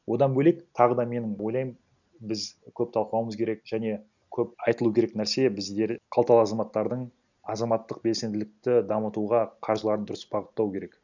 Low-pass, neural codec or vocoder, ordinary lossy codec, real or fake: 7.2 kHz; none; none; real